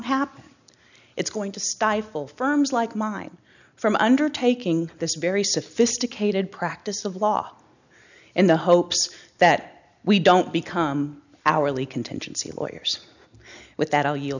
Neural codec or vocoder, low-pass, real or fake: none; 7.2 kHz; real